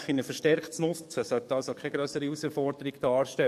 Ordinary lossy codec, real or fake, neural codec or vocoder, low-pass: MP3, 64 kbps; fake; codec, 44.1 kHz, 7.8 kbps, DAC; 14.4 kHz